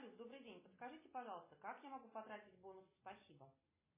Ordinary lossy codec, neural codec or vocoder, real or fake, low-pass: MP3, 16 kbps; none; real; 3.6 kHz